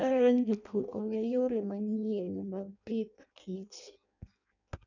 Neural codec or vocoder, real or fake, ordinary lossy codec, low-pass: codec, 16 kHz in and 24 kHz out, 0.6 kbps, FireRedTTS-2 codec; fake; none; 7.2 kHz